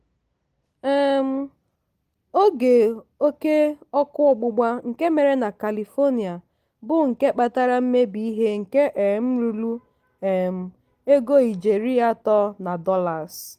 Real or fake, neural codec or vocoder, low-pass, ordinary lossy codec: real; none; 14.4 kHz; Opus, 24 kbps